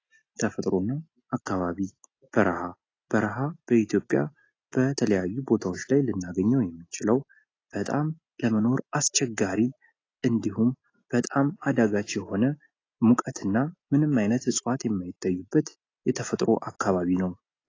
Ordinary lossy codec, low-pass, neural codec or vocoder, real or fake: AAC, 32 kbps; 7.2 kHz; none; real